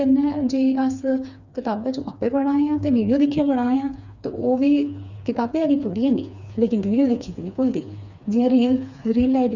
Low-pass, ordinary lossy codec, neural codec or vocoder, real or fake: 7.2 kHz; none; codec, 16 kHz, 4 kbps, FreqCodec, smaller model; fake